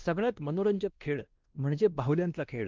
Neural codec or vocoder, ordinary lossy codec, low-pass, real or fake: codec, 16 kHz, 1 kbps, X-Codec, WavLM features, trained on Multilingual LibriSpeech; Opus, 32 kbps; 7.2 kHz; fake